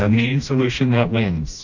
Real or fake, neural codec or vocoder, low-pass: fake; codec, 16 kHz, 1 kbps, FreqCodec, smaller model; 7.2 kHz